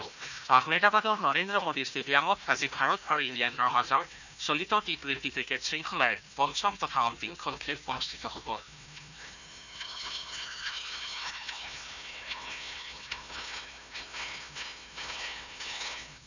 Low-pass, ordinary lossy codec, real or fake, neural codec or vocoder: 7.2 kHz; none; fake; codec, 16 kHz, 1 kbps, FunCodec, trained on Chinese and English, 50 frames a second